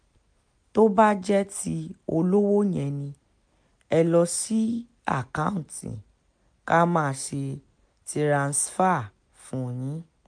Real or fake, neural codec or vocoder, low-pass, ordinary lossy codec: real; none; 9.9 kHz; MP3, 64 kbps